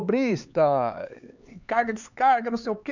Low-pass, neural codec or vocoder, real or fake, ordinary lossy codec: 7.2 kHz; codec, 16 kHz, 4 kbps, X-Codec, HuBERT features, trained on LibriSpeech; fake; none